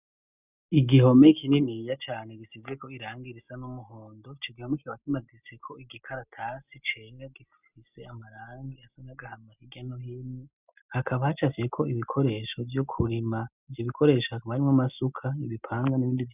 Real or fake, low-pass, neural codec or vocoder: real; 3.6 kHz; none